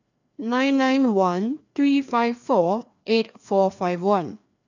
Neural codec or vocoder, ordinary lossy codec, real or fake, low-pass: codec, 16 kHz, 2 kbps, FreqCodec, larger model; none; fake; 7.2 kHz